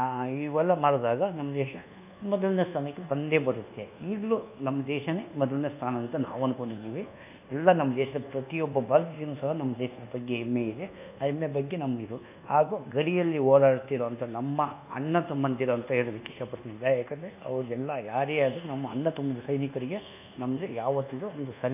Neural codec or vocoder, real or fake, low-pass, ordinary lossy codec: codec, 24 kHz, 1.2 kbps, DualCodec; fake; 3.6 kHz; none